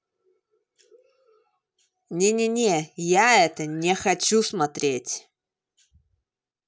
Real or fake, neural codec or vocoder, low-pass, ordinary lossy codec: real; none; none; none